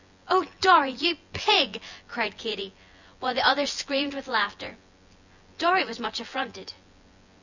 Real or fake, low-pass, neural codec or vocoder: fake; 7.2 kHz; vocoder, 24 kHz, 100 mel bands, Vocos